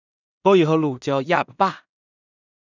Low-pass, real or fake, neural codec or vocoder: 7.2 kHz; fake; codec, 16 kHz in and 24 kHz out, 0.4 kbps, LongCat-Audio-Codec, two codebook decoder